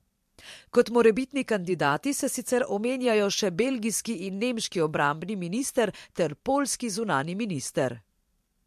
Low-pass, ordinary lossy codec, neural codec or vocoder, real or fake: 14.4 kHz; MP3, 64 kbps; vocoder, 44.1 kHz, 128 mel bands every 512 samples, BigVGAN v2; fake